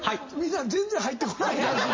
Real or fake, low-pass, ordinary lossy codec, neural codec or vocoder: real; 7.2 kHz; MP3, 32 kbps; none